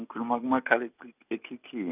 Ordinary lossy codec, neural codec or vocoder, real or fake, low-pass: none; none; real; 3.6 kHz